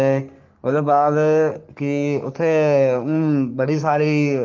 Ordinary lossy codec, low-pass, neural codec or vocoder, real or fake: Opus, 24 kbps; 7.2 kHz; codec, 44.1 kHz, 3.4 kbps, Pupu-Codec; fake